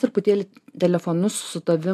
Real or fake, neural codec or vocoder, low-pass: real; none; 14.4 kHz